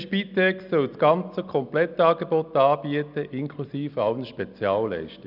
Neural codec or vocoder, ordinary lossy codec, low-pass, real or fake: none; none; 5.4 kHz; real